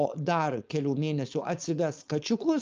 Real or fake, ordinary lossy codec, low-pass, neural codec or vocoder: fake; Opus, 24 kbps; 7.2 kHz; codec, 16 kHz, 4.8 kbps, FACodec